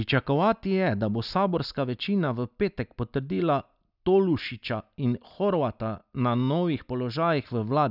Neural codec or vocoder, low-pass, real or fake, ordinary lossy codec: none; 5.4 kHz; real; none